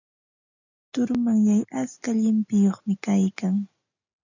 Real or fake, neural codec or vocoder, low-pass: real; none; 7.2 kHz